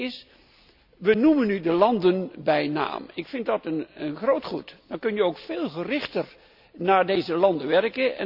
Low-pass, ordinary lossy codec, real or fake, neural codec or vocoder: 5.4 kHz; none; real; none